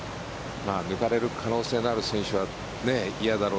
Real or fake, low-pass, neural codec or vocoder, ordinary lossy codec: real; none; none; none